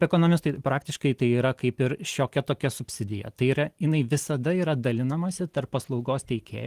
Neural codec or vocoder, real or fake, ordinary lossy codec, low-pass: none; real; Opus, 24 kbps; 14.4 kHz